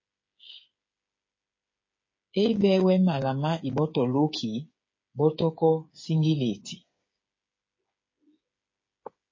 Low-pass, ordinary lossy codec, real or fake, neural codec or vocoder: 7.2 kHz; MP3, 32 kbps; fake; codec, 16 kHz, 8 kbps, FreqCodec, smaller model